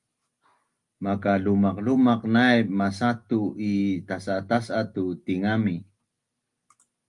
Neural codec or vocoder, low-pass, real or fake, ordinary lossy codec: none; 10.8 kHz; real; Opus, 32 kbps